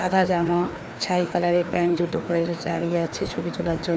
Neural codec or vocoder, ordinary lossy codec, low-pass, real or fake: codec, 16 kHz, 2 kbps, FreqCodec, larger model; none; none; fake